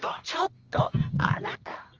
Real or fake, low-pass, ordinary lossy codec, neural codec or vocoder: fake; 7.2 kHz; Opus, 24 kbps; codec, 24 kHz, 0.9 kbps, WavTokenizer, medium music audio release